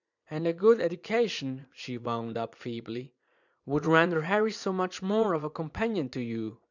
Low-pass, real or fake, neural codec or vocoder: 7.2 kHz; fake; vocoder, 44.1 kHz, 80 mel bands, Vocos